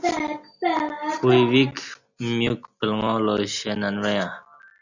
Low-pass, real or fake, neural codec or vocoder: 7.2 kHz; real; none